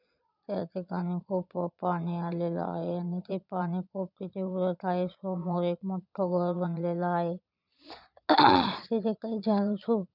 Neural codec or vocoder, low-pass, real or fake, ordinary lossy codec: vocoder, 22.05 kHz, 80 mel bands, Vocos; 5.4 kHz; fake; none